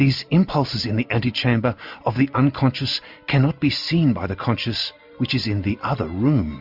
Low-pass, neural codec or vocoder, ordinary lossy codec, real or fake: 5.4 kHz; none; MP3, 48 kbps; real